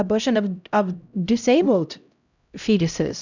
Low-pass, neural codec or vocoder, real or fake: 7.2 kHz; codec, 16 kHz, 0.5 kbps, X-Codec, HuBERT features, trained on LibriSpeech; fake